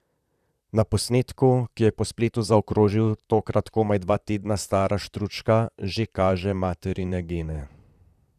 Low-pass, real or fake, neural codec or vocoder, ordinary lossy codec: 14.4 kHz; fake; vocoder, 44.1 kHz, 128 mel bands, Pupu-Vocoder; none